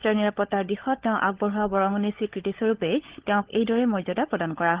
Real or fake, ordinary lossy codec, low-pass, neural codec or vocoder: fake; Opus, 16 kbps; 3.6 kHz; codec, 16 kHz, 16 kbps, FunCodec, trained on LibriTTS, 50 frames a second